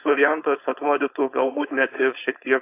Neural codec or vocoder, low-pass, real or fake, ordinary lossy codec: codec, 16 kHz, 4.8 kbps, FACodec; 3.6 kHz; fake; AAC, 24 kbps